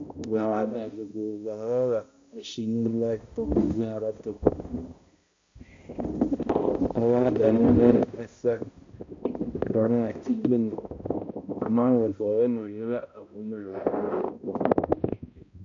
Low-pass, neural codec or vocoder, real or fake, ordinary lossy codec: 7.2 kHz; codec, 16 kHz, 0.5 kbps, X-Codec, HuBERT features, trained on balanced general audio; fake; MP3, 48 kbps